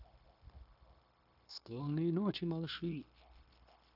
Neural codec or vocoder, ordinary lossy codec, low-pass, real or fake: codec, 16 kHz, 0.9 kbps, LongCat-Audio-Codec; AAC, 48 kbps; 5.4 kHz; fake